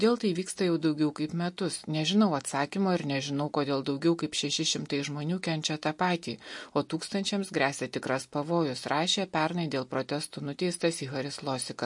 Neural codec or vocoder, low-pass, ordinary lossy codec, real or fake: none; 10.8 kHz; MP3, 48 kbps; real